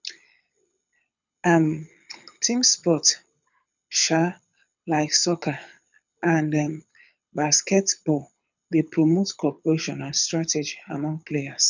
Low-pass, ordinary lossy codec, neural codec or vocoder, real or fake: 7.2 kHz; none; codec, 24 kHz, 6 kbps, HILCodec; fake